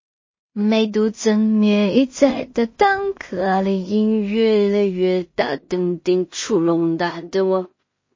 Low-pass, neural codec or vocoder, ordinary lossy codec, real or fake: 7.2 kHz; codec, 16 kHz in and 24 kHz out, 0.4 kbps, LongCat-Audio-Codec, two codebook decoder; MP3, 32 kbps; fake